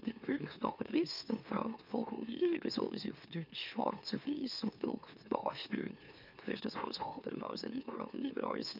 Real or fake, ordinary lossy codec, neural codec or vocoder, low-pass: fake; none; autoencoder, 44.1 kHz, a latent of 192 numbers a frame, MeloTTS; 5.4 kHz